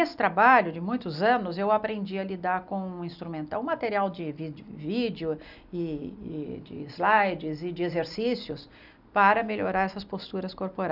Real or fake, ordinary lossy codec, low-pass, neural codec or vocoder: real; none; 5.4 kHz; none